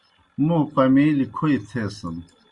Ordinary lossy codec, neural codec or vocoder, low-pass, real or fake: Opus, 64 kbps; none; 10.8 kHz; real